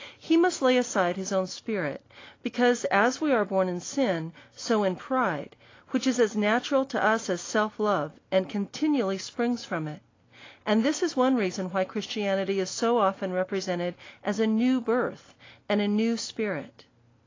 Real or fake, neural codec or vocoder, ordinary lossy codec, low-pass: real; none; AAC, 32 kbps; 7.2 kHz